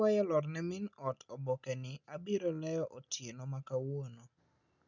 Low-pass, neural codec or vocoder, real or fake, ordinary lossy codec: 7.2 kHz; none; real; none